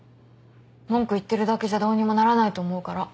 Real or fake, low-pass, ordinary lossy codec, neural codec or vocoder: real; none; none; none